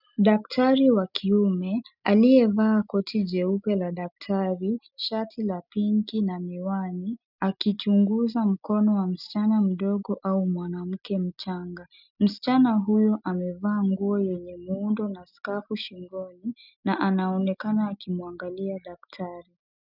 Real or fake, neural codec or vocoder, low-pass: real; none; 5.4 kHz